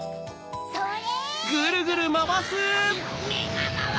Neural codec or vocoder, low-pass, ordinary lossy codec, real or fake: none; none; none; real